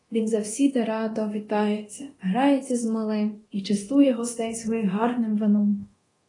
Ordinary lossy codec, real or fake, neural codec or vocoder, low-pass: AAC, 32 kbps; fake; codec, 24 kHz, 0.9 kbps, DualCodec; 10.8 kHz